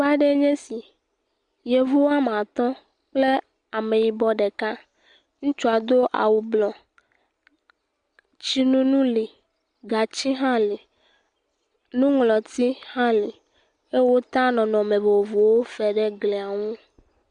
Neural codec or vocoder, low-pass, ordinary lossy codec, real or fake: none; 9.9 kHz; Opus, 64 kbps; real